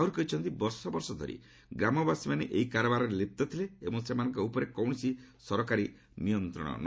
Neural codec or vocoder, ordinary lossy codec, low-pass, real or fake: none; none; none; real